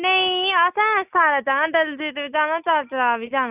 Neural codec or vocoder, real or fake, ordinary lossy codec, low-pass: none; real; none; 3.6 kHz